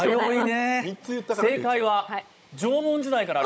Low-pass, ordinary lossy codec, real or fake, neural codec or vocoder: none; none; fake; codec, 16 kHz, 16 kbps, FunCodec, trained on Chinese and English, 50 frames a second